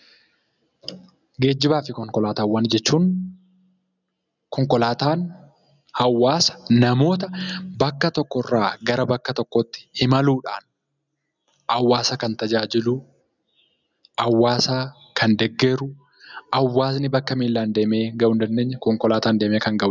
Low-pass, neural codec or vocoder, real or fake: 7.2 kHz; none; real